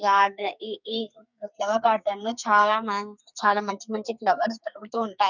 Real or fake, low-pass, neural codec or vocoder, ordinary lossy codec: fake; 7.2 kHz; codec, 44.1 kHz, 2.6 kbps, SNAC; none